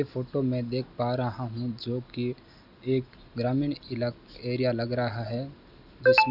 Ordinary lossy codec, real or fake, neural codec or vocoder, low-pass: none; real; none; 5.4 kHz